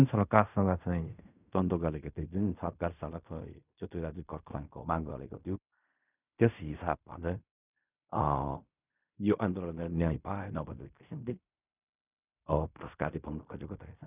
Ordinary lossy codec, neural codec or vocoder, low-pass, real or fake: none; codec, 16 kHz in and 24 kHz out, 0.4 kbps, LongCat-Audio-Codec, fine tuned four codebook decoder; 3.6 kHz; fake